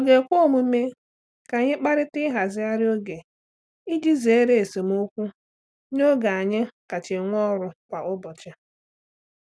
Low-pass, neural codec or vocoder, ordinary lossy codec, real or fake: none; none; none; real